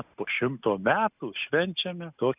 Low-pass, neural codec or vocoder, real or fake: 3.6 kHz; none; real